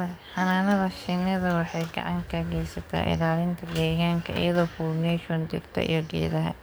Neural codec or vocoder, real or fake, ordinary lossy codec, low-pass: codec, 44.1 kHz, 7.8 kbps, DAC; fake; none; none